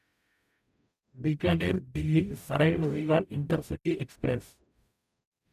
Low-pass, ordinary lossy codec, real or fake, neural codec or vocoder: 14.4 kHz; none; fake; codec, 44.1 kHz, 0.9 kbps, DAC